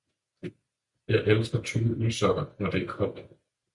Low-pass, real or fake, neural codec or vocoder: 10.8 kHz; real; none